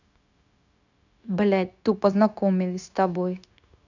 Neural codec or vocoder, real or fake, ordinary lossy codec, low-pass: codec, 16 kHz, 0.9 kbps, LongCat-Audio-Codec; fake; none; 7.2 kHz